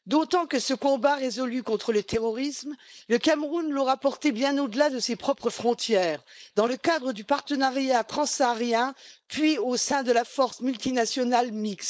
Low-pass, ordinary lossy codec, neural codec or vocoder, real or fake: none; none; codec, 16 kHz, 4.8 kbps, FACodec; fake